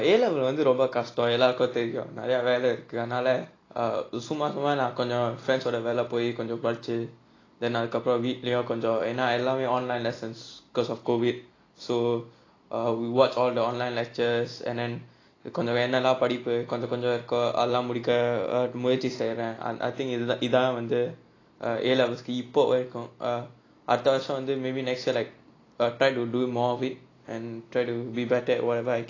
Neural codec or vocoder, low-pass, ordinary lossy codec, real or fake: none; 7.2 kHz; AAC, 32 kbps; real